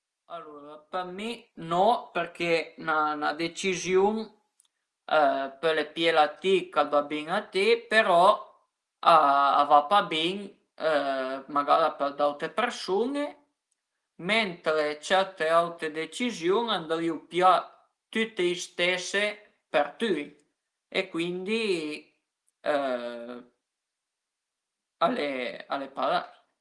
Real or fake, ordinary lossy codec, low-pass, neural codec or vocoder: real; Opus, 24 kbps; 10.8 kHz; none